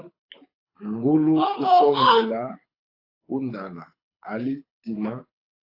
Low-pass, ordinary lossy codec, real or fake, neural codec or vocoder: 5.4 kHz; AAC, 32 kbps; fake; codec, 24 kHz, 6 kbps, HILCodec